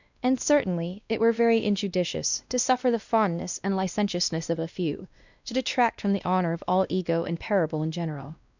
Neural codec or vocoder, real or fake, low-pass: codec, 16 kHz, 1 kbps, X-Codec, WavLM features, trained on Multilingual LibriSpeech; fake; 7.2 kHz